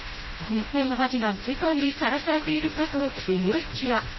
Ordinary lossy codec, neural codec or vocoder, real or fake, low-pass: MP3, 24 kbps; codec, 16 kHz, 0.5 kbps, FreqCodec, smaller model; fake; 7.2 kHz